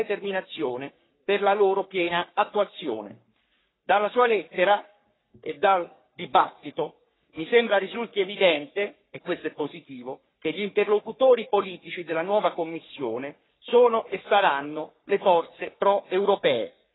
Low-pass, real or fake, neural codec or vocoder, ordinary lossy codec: 7.2 kHz; fake; codec, 44.1 kHz, 3.4 kbps, Pupu-Codec; AAC, 16 kbps